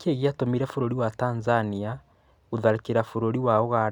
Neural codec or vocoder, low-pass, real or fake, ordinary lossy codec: none; 19.8 kHz; real; none